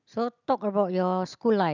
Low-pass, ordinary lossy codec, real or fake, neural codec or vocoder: 7.2 kHz; none; real; none